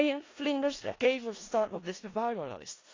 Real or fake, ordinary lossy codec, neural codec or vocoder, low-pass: fake; AAC, 32 kbps; codec, 16 kHz in and 24 kHz out, 0.4 kbps, LongCat-Audio-Codec, four codebook decoder; 7.2 kHz